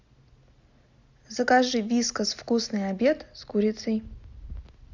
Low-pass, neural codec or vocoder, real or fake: 7.2 kHz; none; real